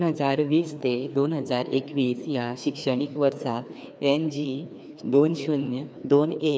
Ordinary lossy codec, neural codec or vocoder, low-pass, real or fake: none; codec, 16 kHz, 2 kbps, FreqCodec, larger model; none; fake